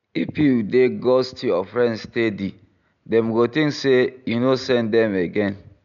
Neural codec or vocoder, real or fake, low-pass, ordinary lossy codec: none; real; 7.2 kHz; none